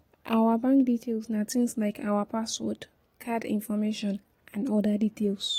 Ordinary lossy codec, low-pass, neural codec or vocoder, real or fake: AAC, 48 kbps; 19.8 kHz; none; real